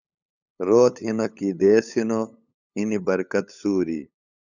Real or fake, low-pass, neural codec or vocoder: fake; 7.2 kHz; codec, 16 kHz, 8 kbps, FunCodec, trained on LibriTTS, 25 frames a second